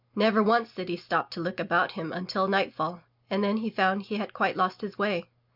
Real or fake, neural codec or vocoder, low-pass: real; none; 5.4 kHz